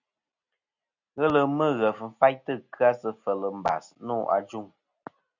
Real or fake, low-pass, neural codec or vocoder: real; 7.2 kHz; none